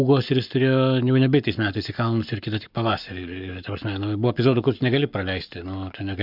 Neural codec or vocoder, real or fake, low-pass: codec, 44.1 kHz, 7.8 kbps, Pupu-Codec; fake; 5.4 kHz